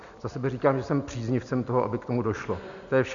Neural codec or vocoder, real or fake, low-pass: none; real; 7.2 kHz